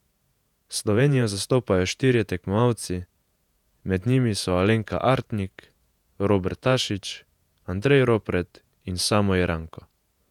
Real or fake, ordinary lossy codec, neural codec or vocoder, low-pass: fake; none; vocoder, 48 kHz, 128 mel bands, Vocos; 19.8 kHz